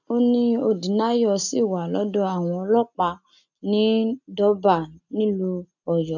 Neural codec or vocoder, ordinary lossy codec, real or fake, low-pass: none; none; real; 7.2 kHz